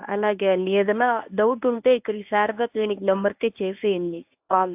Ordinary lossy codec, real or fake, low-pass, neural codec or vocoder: AAC, 32 kbps; fake; 3.6 kHz; codec, 24 kHz, 0.9 kbps, WavTokenizer, medium speech release version 1